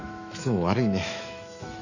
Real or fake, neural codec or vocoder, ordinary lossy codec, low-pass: fake; autoencoder, 48 kHz, 128 numbers a frame, DAC-VAE, trained on Japanese speech; none; 7.2 kHz